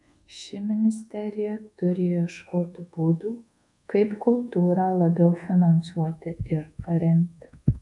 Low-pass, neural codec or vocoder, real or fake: 10.8 kHz; codec, 24 kHz, 1.2 kbps, DualCodec; fake